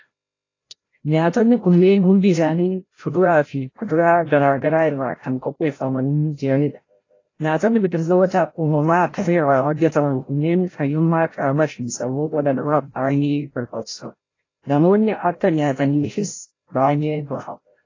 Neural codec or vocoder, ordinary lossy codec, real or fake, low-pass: codec, 16 kHz, 0.5 kbps, FreqCodec, larger model; AAC, 32 kbps; fake; 7.2 kHz